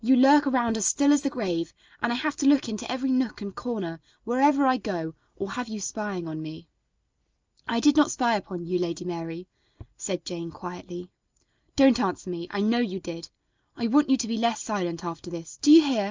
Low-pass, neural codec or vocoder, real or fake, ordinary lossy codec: 7.2 kHz; none; real; Opus, 24 kbps